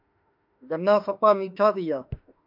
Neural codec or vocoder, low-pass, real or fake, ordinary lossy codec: autoencoder, 48 kHz, 32 numbers a frame, DAC-VAE, trained on Japanese speech; 5.4 kHz; fake; MP3, 48 kbps